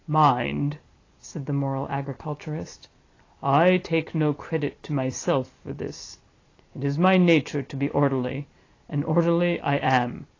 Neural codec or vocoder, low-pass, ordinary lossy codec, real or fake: none; 7.2 kHz; AAC, 32 kbps; real